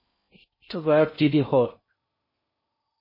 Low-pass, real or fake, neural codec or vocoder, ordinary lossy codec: 5.4 kHz; fake; codec, 16 kHz in and 24 kHz out, 0.6 kbps, FocalCodec, streaming, 4096 codes; MP3, 24 kbps